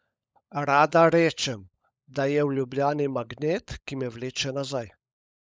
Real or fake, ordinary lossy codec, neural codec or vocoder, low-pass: fake; none; codec, 16 kHz, 16 kbps, FunCodec, trained on LibriTTS, 50 frames a second; none